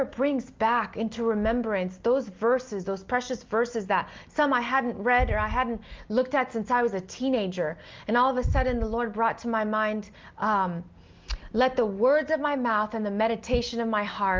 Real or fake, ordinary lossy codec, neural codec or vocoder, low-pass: real; Opus, 32 kbps; none; 7.2 kHz